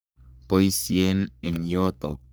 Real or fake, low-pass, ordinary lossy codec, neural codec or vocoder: fake; none; none; codec, 44.1 kHz, 3.4 kbps, Pupu-Codec